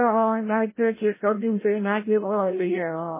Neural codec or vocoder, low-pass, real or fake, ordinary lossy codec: codec, 16 kHz, 0.5 kbps, FreqCodec, larger model; 3.6 kHz; fake; MP3, 16 kbps